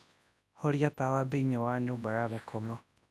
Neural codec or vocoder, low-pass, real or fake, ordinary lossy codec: codec, 24 kHz, 0.9 kbps, WavTokenizer, large speech release; none; fake; none